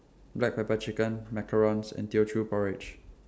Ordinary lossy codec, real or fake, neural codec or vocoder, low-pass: none; real; none; none